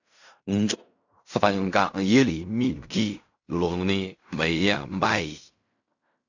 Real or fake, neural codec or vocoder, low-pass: fake; codec, 16 kHz in and 24 kHz out, 0.4 kbps, LongCat-Audio-Codec, fine tuned four codebook decoder; 7.2 kHz